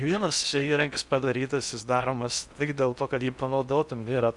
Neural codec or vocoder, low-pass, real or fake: codec, 16 kHz in and 24 kHz out, 0.6 kbps, FocalCodec, streaming, 4096 codes; 10.8 kHz; fake